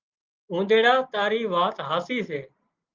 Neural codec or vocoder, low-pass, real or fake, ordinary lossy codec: none; 7.2 kHz; real; Opus, 32 kbps